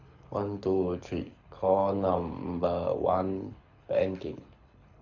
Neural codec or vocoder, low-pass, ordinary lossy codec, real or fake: codec, 24 kHz, 6 kbps, HILCodec; 7.2 kHz; none; fake